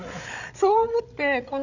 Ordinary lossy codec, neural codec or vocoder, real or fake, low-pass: none; codec, 16 kHz, 16 kbps, FreqCodec, larger model; fake; 7.2 kHz